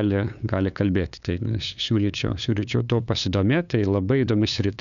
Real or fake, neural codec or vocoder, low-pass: fake; codec, 16 kHz, 2 kbps, FunCodec, trained on LibriTTS, 25 frames a second; 7.2 kHz